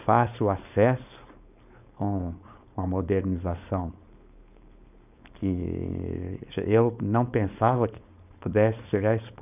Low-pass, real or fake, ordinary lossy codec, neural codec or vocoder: 3.6 kHz; fake; none; codec, 16 kHz, 4.8 kbps, FACodec